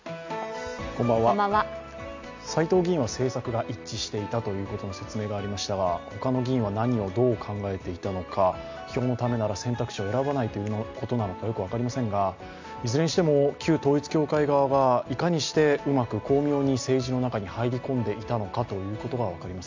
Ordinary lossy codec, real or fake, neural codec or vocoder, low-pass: MP3, 64 kbps; real; none; 7.2 kHz